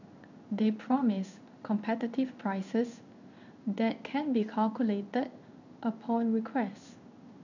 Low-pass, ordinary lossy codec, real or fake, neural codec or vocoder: 7.2 kHz; none; fake; codec, 16 kHz in and 24 kHz out, 1 kbps, XY-Tokenizer